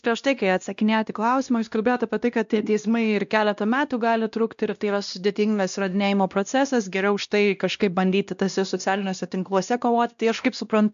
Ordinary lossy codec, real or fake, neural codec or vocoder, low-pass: AAC, 96 kbps; fake; codec, 16 kHz, 1 kbps, X-Codec, WavLM features, trained on Multilingual LibriSpeech; 7.2 kHz